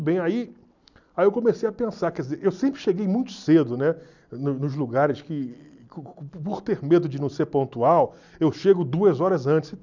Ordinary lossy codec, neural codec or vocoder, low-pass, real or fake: none; none; 7.2 kHz; real